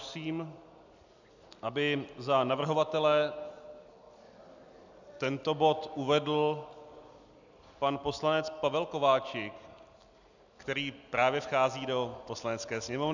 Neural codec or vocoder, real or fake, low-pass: none; real; 7.2 kHz